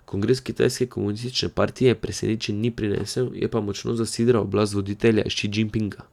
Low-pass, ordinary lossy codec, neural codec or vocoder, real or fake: 19.8 kHz; none; none; real